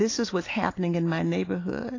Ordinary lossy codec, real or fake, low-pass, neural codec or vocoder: AAC, 32 kbps; real; 7.2 kHz; none